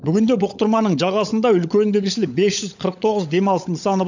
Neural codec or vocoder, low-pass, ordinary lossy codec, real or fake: codec, 16 kHz, 16 kbps, FunCodec, trained on LibriTTS, 50 frames a second; 7.2 kHz; none; fake